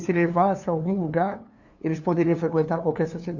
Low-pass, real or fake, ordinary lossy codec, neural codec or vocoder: 7.2 kHz; fake; none; codec, 16 kHz, 2 kbps, FunCodec, trained on LibriTTS, 25 frames a second